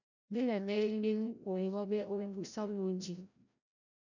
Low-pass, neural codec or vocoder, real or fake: 7.2 kHz; codec, 16 kHz, 0.5 kbps, FreqCodec, larger model; fake